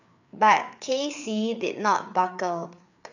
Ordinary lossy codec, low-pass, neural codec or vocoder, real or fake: none; 7.2 kHz; codec, 16 kHz, 4 kbps, FreqCodec, larger model; fake